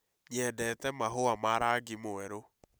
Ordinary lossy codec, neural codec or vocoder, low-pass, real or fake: none; none; none; real